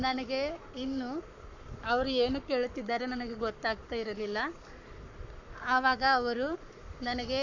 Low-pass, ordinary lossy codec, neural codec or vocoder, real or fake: 7.2 kHz; none; codec, 44.1 kHz, 7.8 kbps, Pupu-Codec; fake